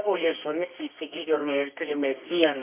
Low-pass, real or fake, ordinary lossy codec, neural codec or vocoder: 3.6 kHz; fake; MP3, 32 kbps; codec, 24 kHz, 0.9 kbps, WavTokenizer, medium music audio release